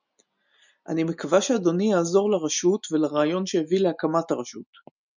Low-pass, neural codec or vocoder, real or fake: 7.2 kHz; none; real